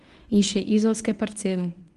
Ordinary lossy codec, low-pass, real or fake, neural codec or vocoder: Opus, 24 kbps; 10.8 kHz; fake; codec, 24 kHz, 0.9 kbps, WavTokenizer, medium speech release version 1